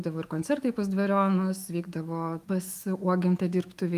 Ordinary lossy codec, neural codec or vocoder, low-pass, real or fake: Opus, 32 kbps; autoencoder, 48 kHz, 128 numbers a frame, DAC-VAE, trained on Japanese speech; 14.4 kHz; fake